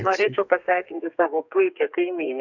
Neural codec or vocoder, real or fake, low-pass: codec, 32 kHz, 1.9 kbps, SNAC; fake; 7.2 kHz